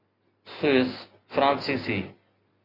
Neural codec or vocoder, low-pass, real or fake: none; 5.4 kHz; real